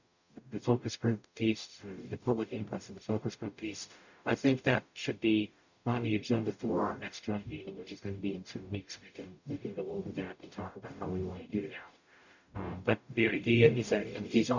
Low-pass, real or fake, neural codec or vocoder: 7.2 kHz; fake; codec, 44.1 kHz, 0.9 kbps, DAC